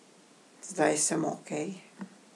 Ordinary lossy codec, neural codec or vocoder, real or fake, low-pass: none; none; real; none